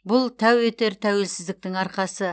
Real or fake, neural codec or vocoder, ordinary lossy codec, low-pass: real; none; none; none